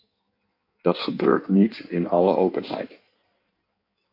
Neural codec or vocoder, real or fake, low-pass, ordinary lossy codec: codec, 16 kHz in and 24 kHz out, 1.1 kbps, FireRedTTS-2 codec; fake; 5.4 kHz; AAC, 32 kbps